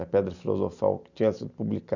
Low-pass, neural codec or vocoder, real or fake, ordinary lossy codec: 7.2 kHz; none; real; none